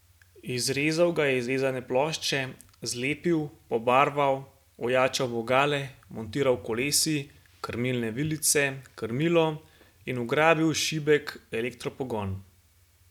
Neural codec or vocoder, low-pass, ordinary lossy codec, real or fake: none; 19.8 kHz; none; real